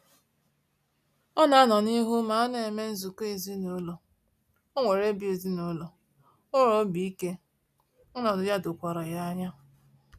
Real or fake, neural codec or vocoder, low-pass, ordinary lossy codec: real; none; 14.4 kHz; none